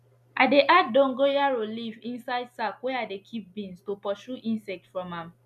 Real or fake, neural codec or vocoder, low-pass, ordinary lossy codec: real; none; 14.4 kHz; none